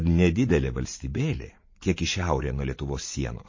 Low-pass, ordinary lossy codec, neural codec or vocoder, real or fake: 7.2 kHz; MP3, 32 kbps; vocoder, 44.1 kHz, 80 mel bands, Vocos; fake